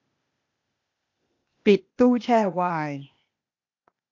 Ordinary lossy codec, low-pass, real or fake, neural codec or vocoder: AAC, 48 kbps; 7.2 kHz; fake; codec, 16 kHz, 0.8 kbps, ZipCodec